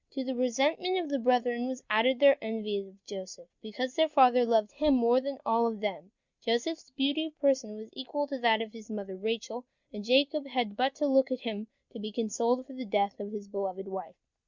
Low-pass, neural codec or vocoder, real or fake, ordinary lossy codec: 7.2 kHz; none; real; Opus, 64 kbps